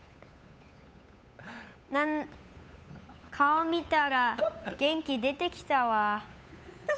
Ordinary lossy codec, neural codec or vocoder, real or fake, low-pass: none; codec, 16 kHz, 8 kbps, FunCodec, trained on Chinese and English, 25 frames a second; fake; none